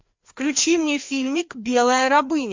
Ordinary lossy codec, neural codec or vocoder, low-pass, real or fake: MP3, 48 kbps; codec, 16 kHz, 2 kbps, FreqCodec, larger model; 7.2 kHz; fake